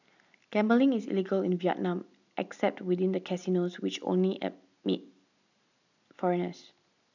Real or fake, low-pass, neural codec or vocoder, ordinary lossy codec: real; 7.2 kHz; none; none